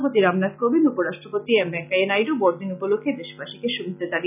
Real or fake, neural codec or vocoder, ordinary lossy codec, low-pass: real; none; none; 3.6 kHz